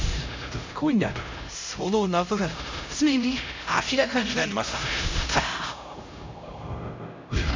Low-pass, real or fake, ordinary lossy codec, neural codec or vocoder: 7.2 kHz; fake; MP3, 64 kbps; codec, 16 kHz, 0.5 kbps, X-Codec, HuBERT features, trained on LibriSpeech